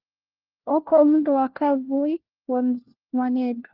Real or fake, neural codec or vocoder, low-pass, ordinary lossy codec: fake; codec, 16 kHz, 1 kbps, FunCodec, trained on LibriTTS, 50 frames a second; 5.4 kHz; Opus, 16 kbps